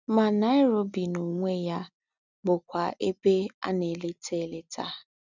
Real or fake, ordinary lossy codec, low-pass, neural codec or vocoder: real; none; 7.2 kHz; none